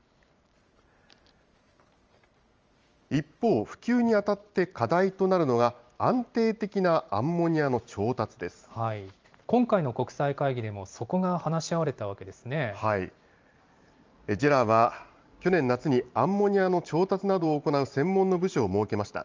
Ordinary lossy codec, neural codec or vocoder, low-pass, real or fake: Opus, 24 kbps; vocoder, 44.1 kHz, 128 mel bands every 512 samples, BigVGAN v2; 7.2 kHz; fake